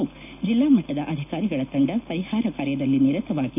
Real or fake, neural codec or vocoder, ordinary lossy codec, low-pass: real; none; none; 3.6 kHz